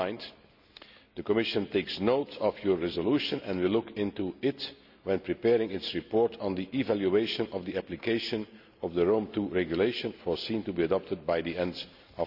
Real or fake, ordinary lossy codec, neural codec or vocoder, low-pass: real; none; none; 5.4 kHz